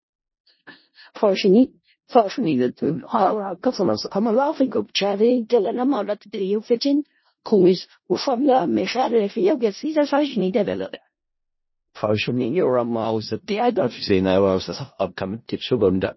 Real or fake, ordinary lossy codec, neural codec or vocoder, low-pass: fake; MP3, 24 kbps; codec, 16 kHz in and 24 kHz out, 0.4 kbps, LongCat-Audio-Codec, four codebook decoder; 7.2 kHz